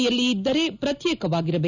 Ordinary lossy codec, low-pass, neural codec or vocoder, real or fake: none; 7.2 kHz; none; real